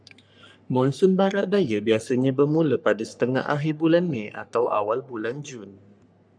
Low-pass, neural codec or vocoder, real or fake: 9.9 kHz; codec, 44.1 kHz, 3.4 kbps, Pupu-Codec; fake